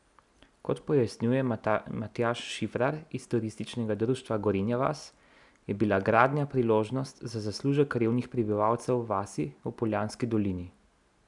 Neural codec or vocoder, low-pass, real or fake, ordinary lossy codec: none; 10.8 kHz; real; none